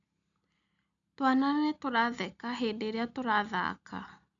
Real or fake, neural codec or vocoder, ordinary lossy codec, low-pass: real; none; none; 7.2 kHz